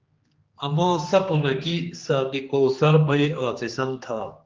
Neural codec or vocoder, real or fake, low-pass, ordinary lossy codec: codec, 16 kHz, 2 kbps, X-Codec, HuBERT features, trained on general audio; fake; 7.2 kHz; Opus, 32 kbps